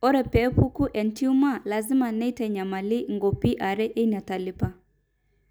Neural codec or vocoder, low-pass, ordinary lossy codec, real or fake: none; none; none; real